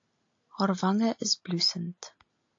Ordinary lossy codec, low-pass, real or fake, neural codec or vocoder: AAC, 48 kbps; 7.2 kHz; real; none